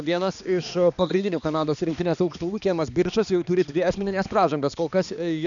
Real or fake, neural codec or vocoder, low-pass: fake; codec, 16 kHz, 2 kbps, X-Codec, HuBERT features, trained on balanced general audio; 7.2 kHz